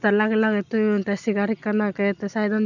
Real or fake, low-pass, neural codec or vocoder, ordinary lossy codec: real; 7.2 kHz; none; none